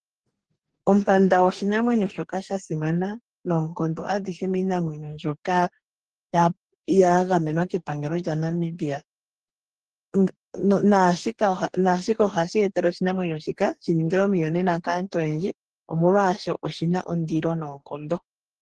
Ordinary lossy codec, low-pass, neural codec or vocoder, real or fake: Opus, 16 kbps; 10.8 kHz; codec, 44.1 kHz, 2.6 kbps, DAC; fake